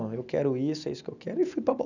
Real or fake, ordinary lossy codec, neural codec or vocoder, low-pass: real; none; none; 7.2 kHz